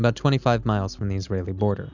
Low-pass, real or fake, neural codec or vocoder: 7.2 kHz; real; none